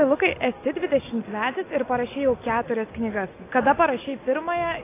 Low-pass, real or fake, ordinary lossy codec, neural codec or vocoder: 3.6 kHz; real; AAC, 24 kbps; none